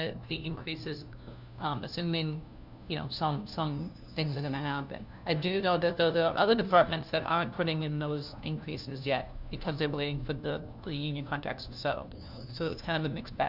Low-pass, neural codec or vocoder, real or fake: 5.4 kHz; codec, 16 kHz, 1 kbps, FunCodec, trained on LibriTTS, 50 frames a second; fake